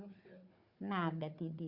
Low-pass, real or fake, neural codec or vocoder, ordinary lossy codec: 5.4 kHz; fake; codec, 16 kHz, 4 kbps, FunCodec, trained on Chinese and English, 50 frames a second; Opus, 64 kbps